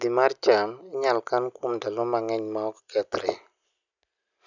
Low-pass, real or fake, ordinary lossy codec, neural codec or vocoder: 7.2 kHz; real; none; none